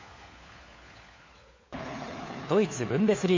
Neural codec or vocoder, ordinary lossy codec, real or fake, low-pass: codec, 16 kHz, 4 kbps, FunCodec, trained on LibriTTS, 50 frames a second; MP3, 32 kbps; fake; 7.2 kHz